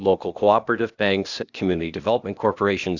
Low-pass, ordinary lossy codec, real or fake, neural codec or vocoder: 7.2 kHz; Opus, 64 kbps; fake; codec, 16 kHz, 0.8 kbps, ZipCodec